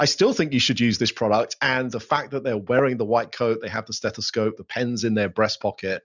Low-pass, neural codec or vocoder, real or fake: 7.2 kHz; none; real